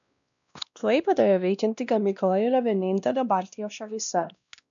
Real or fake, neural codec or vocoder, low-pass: fake; codec, 16 kHz, 1 kbps, X-Codec, WavLM features, trained on Multilingual LibriSpeech; 7.2 kHz